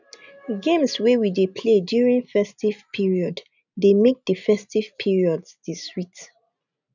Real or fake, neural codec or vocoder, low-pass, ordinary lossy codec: real; none; 7.2 kHz; none